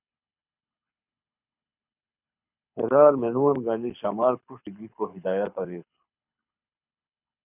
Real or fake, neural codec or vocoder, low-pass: fake; codec, 24 kHz, 6 kbps, HILCodec; 3.6 kHz